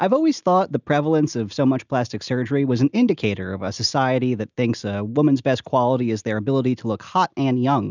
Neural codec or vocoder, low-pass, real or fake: none; 7.2 kHz; real